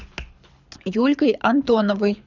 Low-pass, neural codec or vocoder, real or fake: 7.2 kHz; codec, 24 kHz, 6 kbps, HILCodec; fake